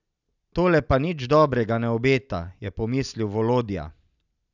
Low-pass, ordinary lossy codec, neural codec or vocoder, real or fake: 7.2 kHz; none; none; real